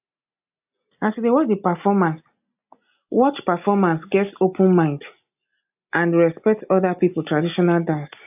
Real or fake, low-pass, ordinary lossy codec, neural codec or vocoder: real; 3.6 kHz; AAC, 32 kbps; none